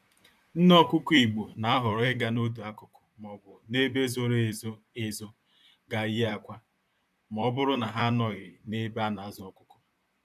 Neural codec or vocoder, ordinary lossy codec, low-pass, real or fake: vocoder, 44.1 kHz, 128 mel bands, Pupu-Vocoder; none; 14.4 kHz; fake